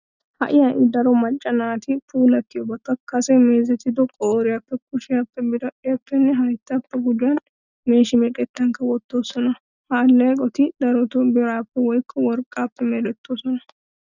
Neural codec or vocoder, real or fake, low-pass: none; real; 7.2 kHz